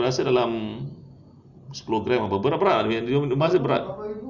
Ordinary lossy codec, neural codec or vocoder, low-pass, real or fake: none; none; 7.2 kHz; real